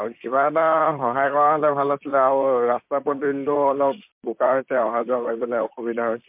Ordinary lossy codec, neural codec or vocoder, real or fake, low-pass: MP3, 32 kbps; vocoder, 44.1 kHz, 128 mel bands, Pupu-Vocoder; fake; 3.6 kHz